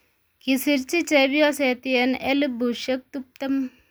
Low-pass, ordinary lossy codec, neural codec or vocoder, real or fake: none; none; none; real